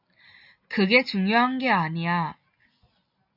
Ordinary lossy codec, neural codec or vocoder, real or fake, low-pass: AAC, 48 kbps; none; real; 5.4 kHz